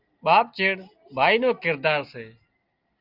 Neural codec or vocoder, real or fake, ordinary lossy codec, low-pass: none; real; Opus, 24 kbps; 5.4 kHz